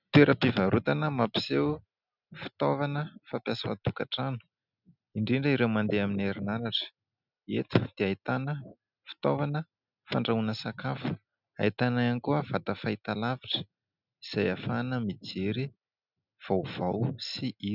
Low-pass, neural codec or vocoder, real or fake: 5.4 kHz; none; real